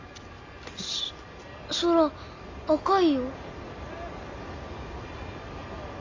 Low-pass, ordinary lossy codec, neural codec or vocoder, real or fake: 7.2 kHz; AAC, 48 kbps; none; real